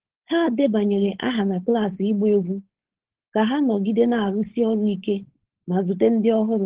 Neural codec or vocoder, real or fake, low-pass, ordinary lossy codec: codec, 16 kHz, 4.8 kbps, FACodec; fake; 3.6 kHz; Opus, 16 kbps